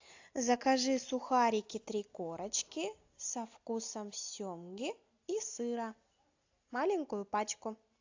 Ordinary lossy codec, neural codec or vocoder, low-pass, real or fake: AAC, 48 kbps; none; 7.2 kHz; real